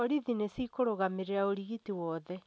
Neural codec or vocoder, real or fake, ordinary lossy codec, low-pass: none; real; none; none